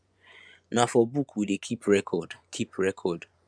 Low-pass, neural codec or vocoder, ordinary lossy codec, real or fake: 9.9 kHz; vocoder, 24 kHz, 100 mel bands, Vocos; none; fake